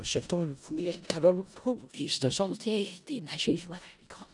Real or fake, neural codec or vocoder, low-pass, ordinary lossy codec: fake; codec, 16 kHz in and 24 kHz out, 0.4 kbps, LongCat-Audio-Codec, four codebook decoder; 10.8 kHz; none